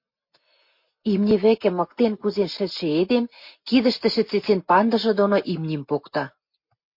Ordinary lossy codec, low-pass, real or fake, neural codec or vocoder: MP3, 32 kbps; 5.4 kHz; real; none